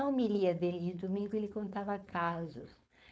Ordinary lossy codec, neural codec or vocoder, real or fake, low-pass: none; codec, 16 kHz, 4.8 kbps, FACodec; fake; none